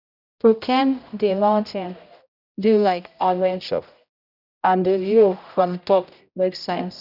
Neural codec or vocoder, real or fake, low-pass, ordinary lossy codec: codec, 16 kHz, 0.5 kbps, X-Codec, HuBERT features, trained on general audio; fake; 5.4 kHz; none